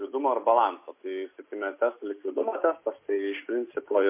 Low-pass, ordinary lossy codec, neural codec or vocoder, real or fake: 3.6 kHz; MP3, 24 kbps; none; real